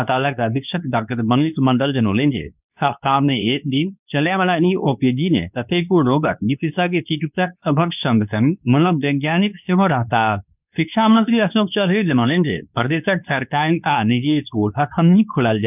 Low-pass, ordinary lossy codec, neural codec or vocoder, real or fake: 3.6 kHz; none; codec, 24 kHz, 0.9 kbps, WavTokenizer, medium speech release version 2; fake